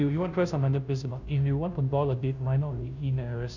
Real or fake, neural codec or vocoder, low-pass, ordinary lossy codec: fake; codec, 16 kHz, 0.5 kbps, FunCodec, trained on Chinese and English, 25 frames a second; 7.2 kHz; none